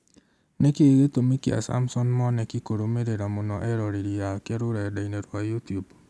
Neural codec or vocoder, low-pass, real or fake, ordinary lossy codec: none; none; real; none